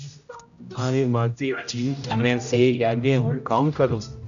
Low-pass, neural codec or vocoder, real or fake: 7.2 kHz; codec, 16 kHz, 0.5 kbps, X-Codec, HuBERT features, trained on general audio; fake